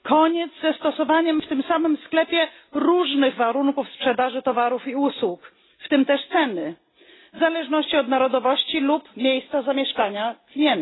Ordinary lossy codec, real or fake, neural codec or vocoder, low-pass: AAC, 16 kbps; real; none; 7.2 kHz